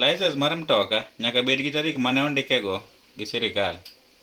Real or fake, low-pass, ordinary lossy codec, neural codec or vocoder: fake; 19.8 kHz; Opus, 24 kbps; vocoder, 44.1 kHz, 128 mel bands every 512 samples, BigVGAN v2